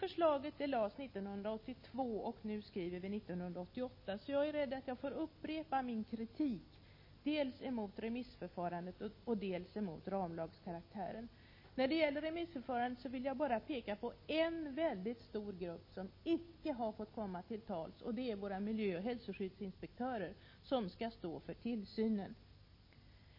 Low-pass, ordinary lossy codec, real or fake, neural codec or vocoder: 5.4 kHz; MP3, 24 kbps; real; none